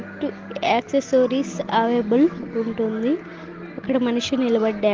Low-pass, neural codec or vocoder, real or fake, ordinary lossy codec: 7.2 kHz; none; real; Opus, 16 kbps